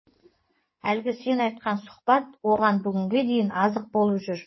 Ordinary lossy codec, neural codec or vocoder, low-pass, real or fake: MP3, 24 kbps; codec, 16 kHz in and 24 kHz out, 2.2 kbps, FireRedTTS-2 codec; 7.2 kHz; fake